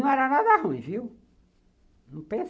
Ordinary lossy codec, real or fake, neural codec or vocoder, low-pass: none; real; none; none